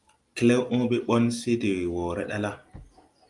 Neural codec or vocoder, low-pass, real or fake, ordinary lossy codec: none; 10.8 kHz; real; Opus, 32 kbps